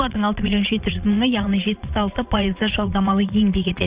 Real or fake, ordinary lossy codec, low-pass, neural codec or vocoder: fake; Opus, 64 kbps; 3.6 kHz; vocoder, 22.05 kHz, 80 mel bands, Vocos